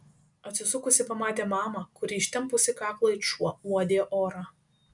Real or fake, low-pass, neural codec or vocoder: real; 10.8 kHz; none